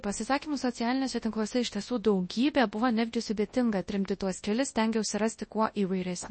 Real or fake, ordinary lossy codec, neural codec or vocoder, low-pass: fake; MP3, 32 kbps; codec, 24 kHz, 0.9 kbps, WavTokenizer, large speech release; 9.9 kHz